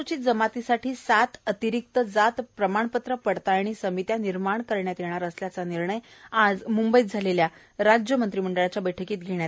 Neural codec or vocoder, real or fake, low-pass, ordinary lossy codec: none; real; none; none